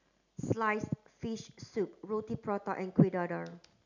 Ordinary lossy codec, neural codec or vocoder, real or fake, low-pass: none; none; real; 7.2 kHz